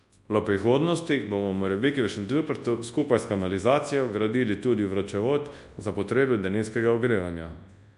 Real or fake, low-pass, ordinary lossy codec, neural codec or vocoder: fake; 10.8 kHz; AAC, 64 kbps; codec, 24 kHz, 0.9 kbps, WavTokenizer, large speech release